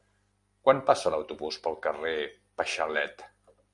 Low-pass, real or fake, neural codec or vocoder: 10.8 kHz; real; none